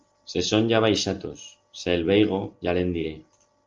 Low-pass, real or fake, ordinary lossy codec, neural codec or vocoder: 7.2 kHz; real; Opus, 32 kbps; none